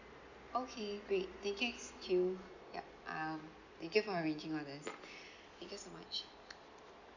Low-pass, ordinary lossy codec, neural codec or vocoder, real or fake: 7.2 kHz; none; none; real